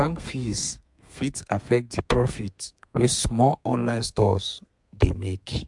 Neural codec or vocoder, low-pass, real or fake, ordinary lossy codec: codec, 32 kHz, 1.9 kbps, SNAC; 10.8 kHz; fake; MP3, 64 kbps